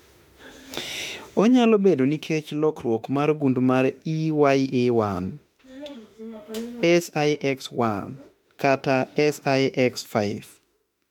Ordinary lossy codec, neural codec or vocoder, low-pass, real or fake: MP3, 96 kbps; autoencoder, 48 kHz, 32 numbers a frame, DAC-VAE, trained on Japanese speech; 19.8 kHz; fake